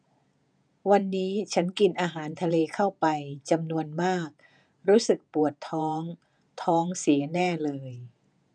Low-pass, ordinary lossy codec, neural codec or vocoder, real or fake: 9.9 kHz; none; vocoder, 48 kHz, 128 mel bands, Vocos; fake